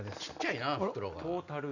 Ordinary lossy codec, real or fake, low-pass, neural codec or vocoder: none; real; 7.2 kHz; none